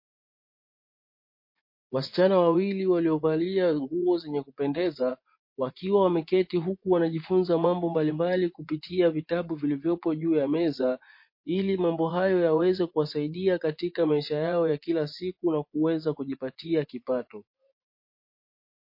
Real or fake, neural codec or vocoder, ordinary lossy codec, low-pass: fake; vocoder, 24 kHz, 100 mel bands, Vocos; MP3, 32 kbps; 5.4 kHz